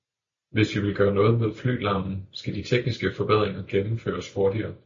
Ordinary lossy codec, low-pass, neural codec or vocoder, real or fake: MP3, 32 kbps; 7.2 kHz; none; real